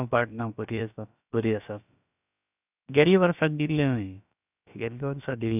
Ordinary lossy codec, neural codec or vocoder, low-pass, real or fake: none; codec, 16 kHz, about 1 kbps, DyCAST, with the encoder's durations; 3.6 kHz; fake